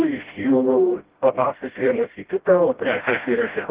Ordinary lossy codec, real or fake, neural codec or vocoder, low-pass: Opus, 16 kbps; fake; codec, 16 kHz, 0.5 kbps, FreqCodec, smaller model; 3.6 kHz